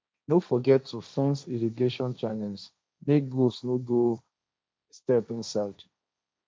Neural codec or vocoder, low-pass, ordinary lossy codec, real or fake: codec, 16 kHz, 1.1 kbps, Voila-Tokenizer; none; none; fake